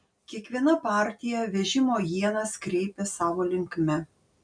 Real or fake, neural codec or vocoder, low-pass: real; none; 9.9 kHz